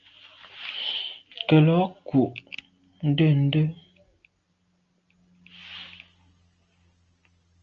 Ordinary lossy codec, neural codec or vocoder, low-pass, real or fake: Opus, 24 kbps; none; 7.2 kHz; real